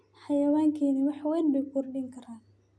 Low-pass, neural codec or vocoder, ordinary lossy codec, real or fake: none; none; none; real